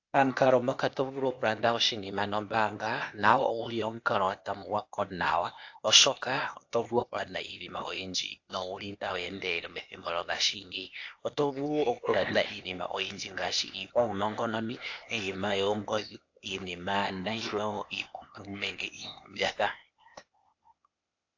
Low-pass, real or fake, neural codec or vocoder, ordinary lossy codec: 7.2 kHz; fake; codec, 16 kHz, 0.8 kbps, ZipCodec; AAC, 48 kbps